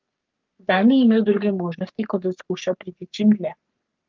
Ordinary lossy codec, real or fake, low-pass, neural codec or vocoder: Opus, 32 kbps; fake; 7.2 kHz; codec, 44.1 kHz, 3.4 kbps, Pupu-Codec